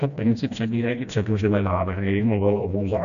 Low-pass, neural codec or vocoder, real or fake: 7.2 kHz; codec, 16 kHz, 1 kbps, FreqCodec, smaller model; fake